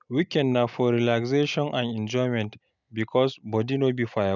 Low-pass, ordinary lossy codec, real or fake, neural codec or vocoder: 7.2 kHz; none; real; none